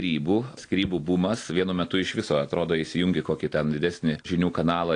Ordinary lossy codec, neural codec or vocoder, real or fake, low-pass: AAC, 48 kbps; none; real; 9.9 kHz